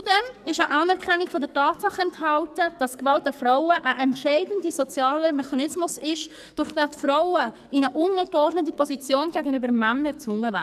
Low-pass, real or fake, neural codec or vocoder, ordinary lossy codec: 14.4 kHz; fake; codec, 44.1 kHz, 2.6 kbps, SNAC; none